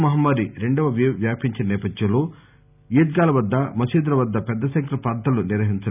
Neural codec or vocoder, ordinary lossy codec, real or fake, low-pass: none; none; real; 3.6 kHz